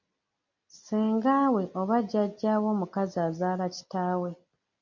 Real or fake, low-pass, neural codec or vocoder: real; 7.2 kHz; none